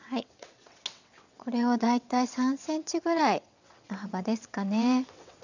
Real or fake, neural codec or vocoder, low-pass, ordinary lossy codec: fake; vocoder, 22.05 kHz, 80 mel bands, WaveNeXt; 7.2 kHz; none